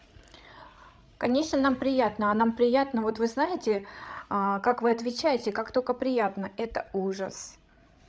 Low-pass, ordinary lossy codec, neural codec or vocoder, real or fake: none; none; codec, 16 kHz, 16 kbps, FreqCodec, larger model; fake